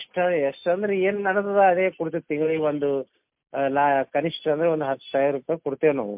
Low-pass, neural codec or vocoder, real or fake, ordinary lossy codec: 3.6 kHz; none; real; MP3, 32 kbps